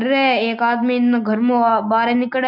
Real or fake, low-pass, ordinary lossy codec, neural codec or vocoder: real; 5.4 kHz; none; none